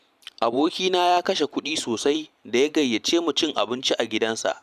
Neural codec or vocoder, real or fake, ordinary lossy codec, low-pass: vocoder, 44.1 kHz, 128 mel bands every 512 samples, BigVGAN v2; fake; none; 14.4 kHz